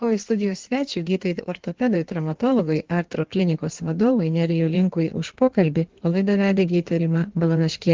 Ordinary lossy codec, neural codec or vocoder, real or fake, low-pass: Opus, 16 kbps; codec, 16 kHz in and 24 kHz out, 1.1 kbps, FireRedTTS-2 codec; fake; 7.2 kHz